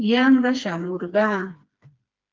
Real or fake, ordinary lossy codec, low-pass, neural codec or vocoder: fake; Opus, 32 kbps; 7.2 kHz; codec, 16 kHz, 2 kbps, FreqCodec, smaller model